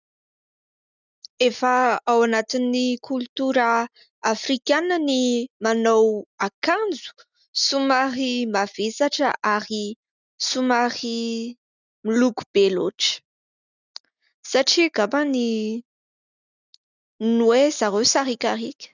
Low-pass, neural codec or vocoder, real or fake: 7.2 kHz; none; real